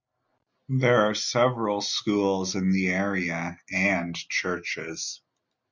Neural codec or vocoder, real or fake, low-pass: none; real; 7.2 kHz